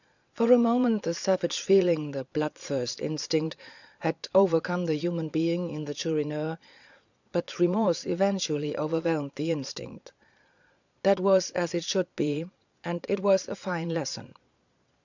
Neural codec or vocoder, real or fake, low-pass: vocoder, 22.05 kHz, 80 mel bands, Vocos; fake; 7.2 kHz